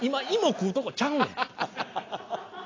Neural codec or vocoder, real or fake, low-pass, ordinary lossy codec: none; real; 7.2 kHz; AAC, 32 kbps